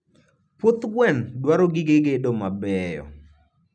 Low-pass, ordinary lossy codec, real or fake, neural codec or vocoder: 9.9 kHz; none; real; none